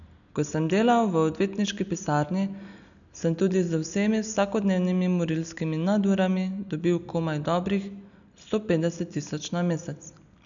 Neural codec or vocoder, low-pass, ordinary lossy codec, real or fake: none; 7.2 kHz; none; real